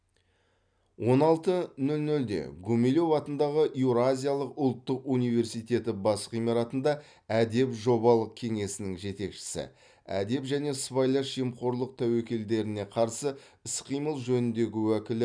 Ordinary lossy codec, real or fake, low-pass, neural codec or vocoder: none; real; 9.9 kHz; none